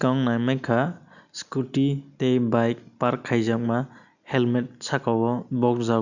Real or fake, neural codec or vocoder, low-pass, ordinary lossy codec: real; none; 7.2 kHz; none